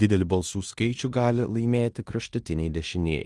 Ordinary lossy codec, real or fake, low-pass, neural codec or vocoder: Opus, 24 kbps; fake; 10.8 kHz; codec, 16 kHz in and 24 kHz out, 0.9 kbps, LongCat-Audio-Codec, fine tuned four codebook decoder